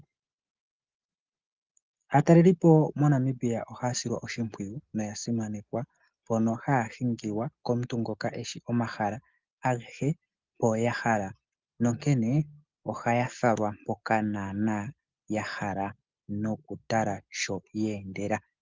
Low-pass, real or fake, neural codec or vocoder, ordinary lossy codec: 7.2 kHz; real; none; Opus, 32 kbps